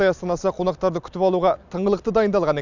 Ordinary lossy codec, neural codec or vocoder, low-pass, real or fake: none; none; 7.2 kHz; real